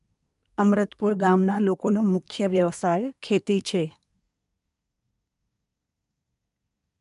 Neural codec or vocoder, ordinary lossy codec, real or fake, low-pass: codec, 24 kHz, 1 kbps, SNAC; none; fake; 10.8 kHz